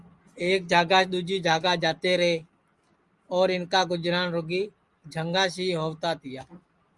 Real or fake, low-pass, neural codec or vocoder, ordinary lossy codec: real; 10.8 kHz; none; Opus, 32 kbps